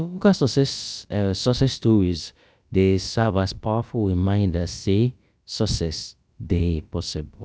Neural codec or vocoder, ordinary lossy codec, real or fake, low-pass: codec, 16 kHz, about 1 kbps, DyCAST, with the encoder's durations; none; fake; none